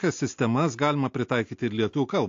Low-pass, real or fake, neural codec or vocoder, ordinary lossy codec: 7.2 kHz; real; none; AAC, 48 kbps